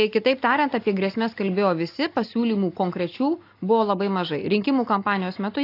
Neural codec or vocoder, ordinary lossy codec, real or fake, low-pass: none; AAC, 32 kbps; real; 5.4 kHz